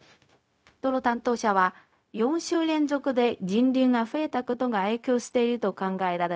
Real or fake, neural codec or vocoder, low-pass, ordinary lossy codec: fake; codec, 16 kHz, 0.4 kbps, LongCat-Audio-Codec; none; none